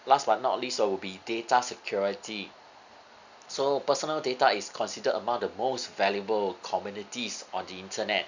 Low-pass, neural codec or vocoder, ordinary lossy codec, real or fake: 7.2 kHz; none; none; real